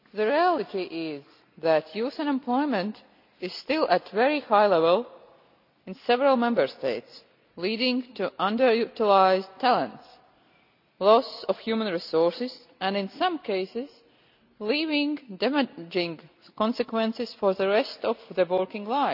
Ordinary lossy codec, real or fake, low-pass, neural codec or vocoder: none; real; 5.4 kHz; none